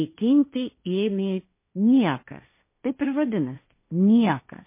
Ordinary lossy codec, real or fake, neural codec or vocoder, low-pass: MP3, 24 kbps; fake; codec, 16 kHz, 1.1 kbps, Voila-Tokenizer; 3.6 kHz